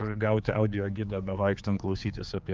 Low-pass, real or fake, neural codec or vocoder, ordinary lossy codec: 7.2 kHz; fake; codec, 16 kHz, 4 kbps, X-Codec, HuBERT features, trained on general audio; Opus, 24 kbps